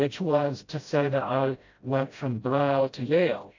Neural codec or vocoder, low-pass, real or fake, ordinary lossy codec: codec, 16 kHz, 0.5 kbps, FreqCodec, smaller model; 7.2 kHz; fake; AAC, 48 kbps